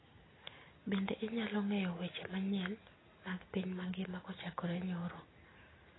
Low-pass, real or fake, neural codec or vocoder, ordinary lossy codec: 7.2 kHz; real; none; AAC, 16 kbps